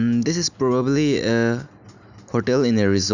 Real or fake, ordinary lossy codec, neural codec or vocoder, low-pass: real; none; none; 7.2 kHz